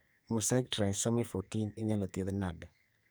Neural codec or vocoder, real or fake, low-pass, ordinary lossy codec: codec, 44.1 kHz, 2.6 kbps, SNAC; fake; none; none